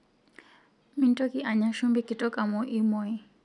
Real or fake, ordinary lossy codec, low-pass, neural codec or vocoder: real; none; 10.8 kHz; none